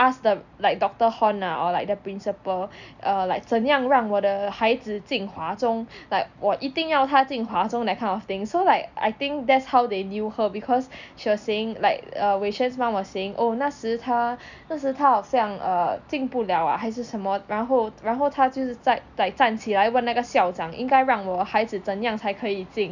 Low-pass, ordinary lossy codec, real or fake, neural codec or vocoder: 7.2 kHz; none; real; none